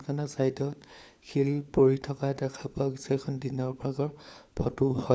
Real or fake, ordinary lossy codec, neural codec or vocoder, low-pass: fake; none; codec, 16 kHz, 4 kbps, FunCodec, trained on LibriTTS, 50 frames a second; none